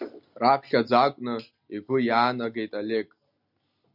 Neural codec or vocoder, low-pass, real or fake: none; 5.4 kHz; real